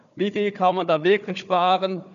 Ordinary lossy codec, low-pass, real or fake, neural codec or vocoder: none; 7.2 kHz; fake; codec, 16 kHz, 4 kbps, FunCodec, trained on Chinese and English, 50 frames a second